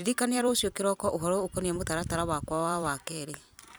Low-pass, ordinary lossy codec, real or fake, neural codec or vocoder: none; none; fake; vocoder, 44.1 kHz, 128 mel bands every 256 samples, BigVGAN v2